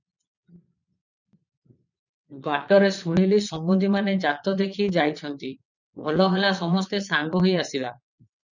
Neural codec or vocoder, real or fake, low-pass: vocoder, 22.05 kHz, 80 mel bands, Vocos; fake; 7.2 kHz